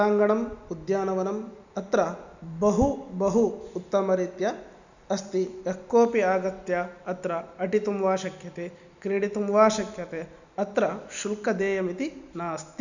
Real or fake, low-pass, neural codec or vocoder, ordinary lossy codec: real; 7.2 kHz; none; none